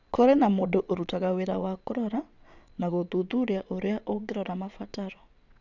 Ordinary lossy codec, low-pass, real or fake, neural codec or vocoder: none; 7.2 kHz; fake; vocoder, 22.05 kHz, 80 mel bands, WaveNeXt